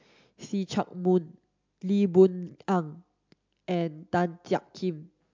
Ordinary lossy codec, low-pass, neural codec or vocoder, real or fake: MP3, 64 kbps; 7.2 kHz; none; real